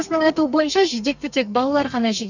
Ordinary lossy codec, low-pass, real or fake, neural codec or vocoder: none; 7.2 kHz; fake; codec, 32 kHz, 1.9 kbps, SNAC